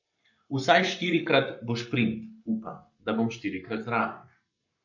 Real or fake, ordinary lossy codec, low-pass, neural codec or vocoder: fake; none; 7.2 kHz; codec, 44.1 kHz, 7.8 kbps, Pupu-Codec